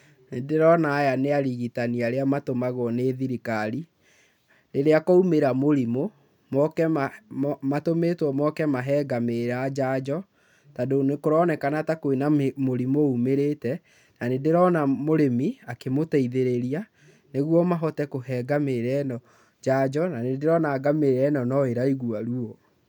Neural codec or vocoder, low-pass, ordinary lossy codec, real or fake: none; 19.8 kHz; none; real